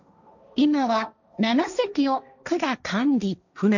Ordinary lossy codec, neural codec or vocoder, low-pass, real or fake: none; codec, 16 kHz, 1.1 kbps, Voila-Tokenizer; 7.2 kHz; fake